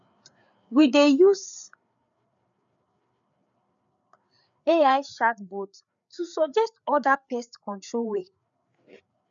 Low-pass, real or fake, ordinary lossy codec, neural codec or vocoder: 7.2 kHz; fake; none; codec, 16 kHz, 4 kbps, FreqCodec, larger model